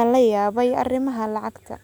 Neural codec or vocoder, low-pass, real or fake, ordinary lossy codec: none; none; real; none